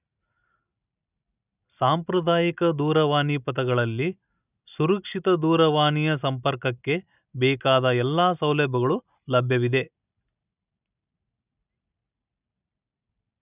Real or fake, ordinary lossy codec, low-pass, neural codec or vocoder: real; none; 3.6 kHz; none